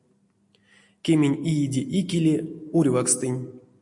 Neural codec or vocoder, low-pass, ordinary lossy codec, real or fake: none; 10.8 kHz; MP3, 64 kbps; real